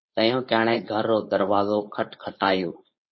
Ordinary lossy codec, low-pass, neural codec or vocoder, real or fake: MP3, 24 kbps; 7.2 kHz; codec, 16 kHz, 4.8 kbps, FACodec; fake